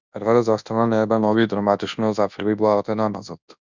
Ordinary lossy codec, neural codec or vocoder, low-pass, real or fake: Opus, 64 kbps; codec, 24 kHz, 0.9 kbps, WavTokenizer, large speech release; 7.2 kHz; fake